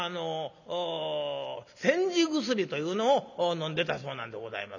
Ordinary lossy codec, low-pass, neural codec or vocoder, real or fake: none; 7.2 kHz; none; real